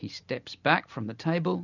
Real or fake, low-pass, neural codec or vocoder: real; 7.2 kHz; none